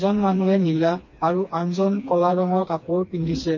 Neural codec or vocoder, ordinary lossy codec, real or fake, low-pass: codec, 16 kHz, 2 kbps, FreqCodec, smaller model; MP3, 32 kbps; fake; 7.2 kHz